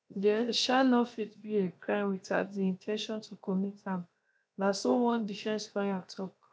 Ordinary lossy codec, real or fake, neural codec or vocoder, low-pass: none; fake; codec, 16 kHz, 0.7 kbps, FocalCodec; none